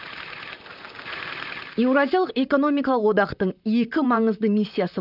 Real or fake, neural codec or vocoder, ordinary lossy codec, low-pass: fake; vocoder, 44.1 kHz, 128 mel bands, Pupu-Vocoder; none; 5.4 kHz